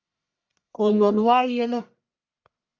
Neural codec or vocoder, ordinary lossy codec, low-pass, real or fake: codec, 44.1 kHz, 1.7 kbps, Pupu-Codec; Opus, 64 kbps; 7.2 kHz; fake